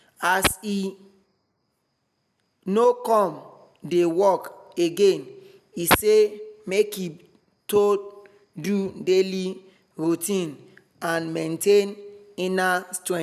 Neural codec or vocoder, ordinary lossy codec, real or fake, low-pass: none; none; real; 14.4 kHz